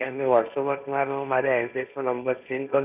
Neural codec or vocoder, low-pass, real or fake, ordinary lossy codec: codec, 16 kHz, 1.1 kbps, Voila-Tokenizer; 3.6 kHz; fake; none